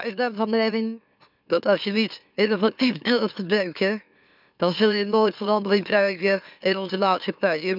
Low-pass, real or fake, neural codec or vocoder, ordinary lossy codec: 5.4 kHz; fake; autoencoder, 44.1 kHz, a latent of 192 numbers a frame, MeloTTS; none